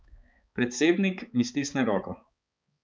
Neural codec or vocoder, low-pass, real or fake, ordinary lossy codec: codec, 16 kHz, 4 kbps, X-Codec, HuBERT features, trained on balanced general audio; none; fake; none